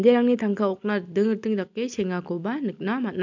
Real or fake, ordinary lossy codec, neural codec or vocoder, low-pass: real; MP3, 64 kbps; none; 7.2 kHz